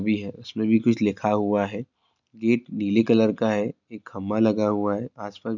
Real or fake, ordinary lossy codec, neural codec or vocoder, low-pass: real; none; none; 7.2 kHz